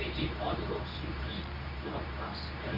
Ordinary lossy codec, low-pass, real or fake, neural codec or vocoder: none; 5.4 kHz; fake; codec, 24 kHz, 0.9 kbps, WavTokenizer, medium speech release version 2